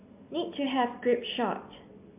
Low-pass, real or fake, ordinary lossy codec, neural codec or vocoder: 3.6 kHz; real; MP3, 32 kbps; none